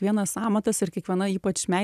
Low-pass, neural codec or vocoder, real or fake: 14.4 kHz; none; real